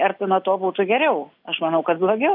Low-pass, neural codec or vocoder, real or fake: 5.4 kHz; none; real